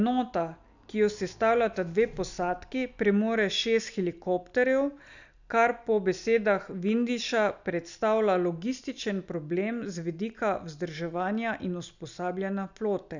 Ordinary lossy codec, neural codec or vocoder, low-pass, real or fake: none; none; 7.2 kHz; real